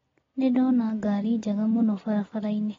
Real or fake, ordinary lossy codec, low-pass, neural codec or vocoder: real; AAC, 24 kbps; 7.2 kHz; none